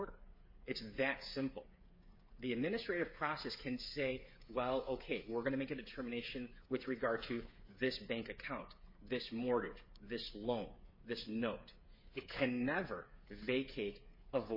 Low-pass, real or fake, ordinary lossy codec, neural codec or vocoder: 5.4 kHz; fake; MP3, 24 kbps; codec, 16 kHz, 8 kbps, FreqCodec, smaller model